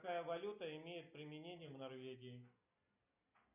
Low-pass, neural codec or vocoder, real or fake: 3.6 kHz; none; real